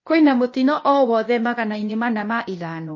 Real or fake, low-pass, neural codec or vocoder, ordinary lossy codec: fake; 7.2 kHz; codec, 16 kHz, 0.8 kbps, ZipCodec; MP3, 32 kbps